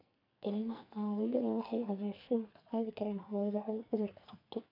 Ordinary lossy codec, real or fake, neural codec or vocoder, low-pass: MP3, 48 kbps; fake; codec, 44.1 kHz, 2.6 kbps, SNAC; 5.4 kHz